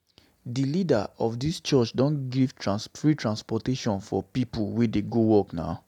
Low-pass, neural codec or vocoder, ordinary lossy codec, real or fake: 19.8 kHz; none; none; real